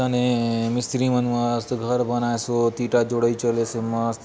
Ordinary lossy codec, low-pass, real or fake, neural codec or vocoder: none; none; real; none